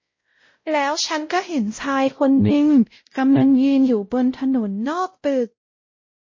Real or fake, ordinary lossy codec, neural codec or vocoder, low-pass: fake; MP3, 32 kbps; codec, 16 kHz, 0.5 kbps, X-Codec, WavLM features, trained on Multilingual LibriSpeech; 7.2 kHz